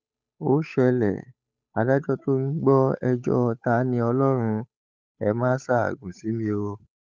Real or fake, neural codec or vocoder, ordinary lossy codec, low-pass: fake; codec, 16 kHz, 8 kbps, FunCodec, trained on Chinese and English, 25 frames a second; none; none